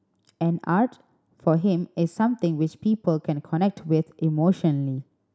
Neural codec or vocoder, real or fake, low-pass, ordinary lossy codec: none; real; none; none